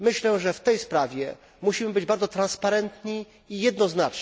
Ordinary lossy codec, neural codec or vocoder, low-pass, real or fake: none; none; none; real